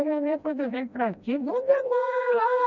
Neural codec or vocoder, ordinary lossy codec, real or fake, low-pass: codec, 16 kHz, 1 kbps, FreqCodec, smaller model; none; fake; 7.2 kHz